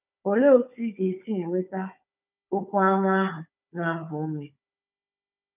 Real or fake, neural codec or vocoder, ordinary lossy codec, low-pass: fake; codec, 16 kHz, 4 kbps, FunCodec, trained on Chinese and English, 50 frames a second; none; 3.6 kHz